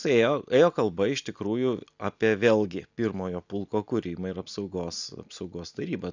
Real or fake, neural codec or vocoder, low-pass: real; none; 7.2 kHz